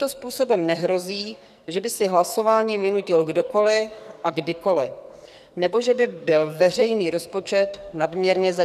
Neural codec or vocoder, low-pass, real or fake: codec, 44.1 kHz, 2.6 kbps, SNAC; 14.4 kHz; fake